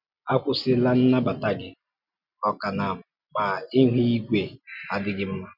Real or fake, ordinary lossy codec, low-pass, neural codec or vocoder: real; none; 5.4 kHz; none